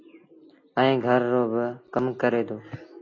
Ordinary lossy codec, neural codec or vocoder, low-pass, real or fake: AAC, 32 kbps; none; 7.2 kHz; real